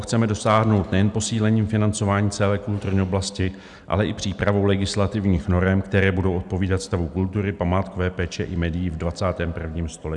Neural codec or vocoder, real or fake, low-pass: none; real; 10.8 kHz